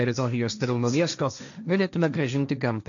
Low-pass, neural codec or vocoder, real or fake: 7.2 kHz; codec, 16 kHz, 1.1 kbps, Voila-Tokenizer; fake